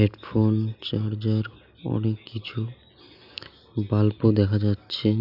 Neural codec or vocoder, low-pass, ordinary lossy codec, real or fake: none; 5.4 kHz; none; real